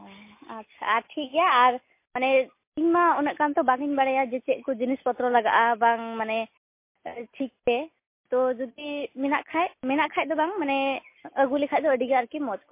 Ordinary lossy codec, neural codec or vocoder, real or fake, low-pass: MP3, 24 kbps; none; real; 3.6 kHz